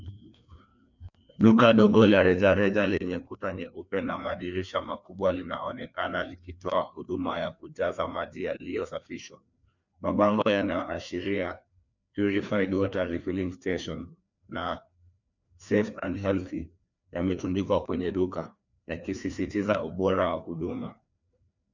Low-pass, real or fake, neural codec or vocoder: 7.2 kHz; fake; codec, 16 kHz, 2 kbps, FreqCodec, larger model